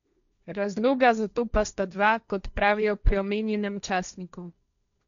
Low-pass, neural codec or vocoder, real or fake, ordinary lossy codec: 7.2 kHz; codec, 16 kHz, 1.1 kbps, Voila-Tokenizer; fake; none